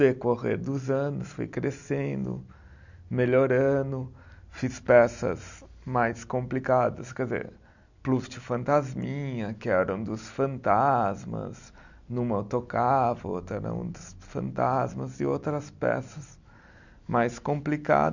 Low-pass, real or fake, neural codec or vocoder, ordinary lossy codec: 7.2 kHz; real; none; none